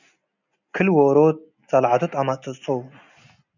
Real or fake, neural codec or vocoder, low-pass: real; none; 7.2 kHz